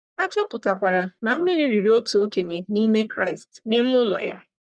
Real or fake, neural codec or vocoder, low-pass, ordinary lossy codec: fake; codec, 44.1 kHz, 1.7 kbps, Pupu-Codec; 9.9 kHz; none